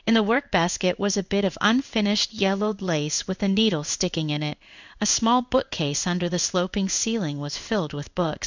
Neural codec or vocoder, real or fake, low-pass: codec, 16 kHz in and 24 kHz out, 1 kbps, XY-Tokenizer; fake; 7.2 kHz